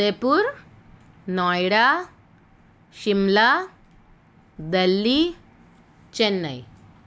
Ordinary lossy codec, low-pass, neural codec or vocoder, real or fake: none; none; none; real